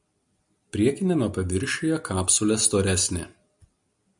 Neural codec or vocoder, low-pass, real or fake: none; 10.8 kHz; real